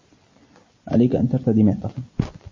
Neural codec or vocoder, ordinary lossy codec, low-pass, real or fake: vocoder, 44.1 kHz, 128 mel bands every 256 samples, BigVGAN v2; MP3, 32 kbps; 7.2 kHz; fake